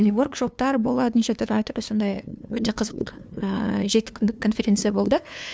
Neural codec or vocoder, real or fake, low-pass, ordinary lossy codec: codec, 16 kHz, 2 kbps, FunCodec, trained on LibriTTS, 25 frames a second; fake; none; none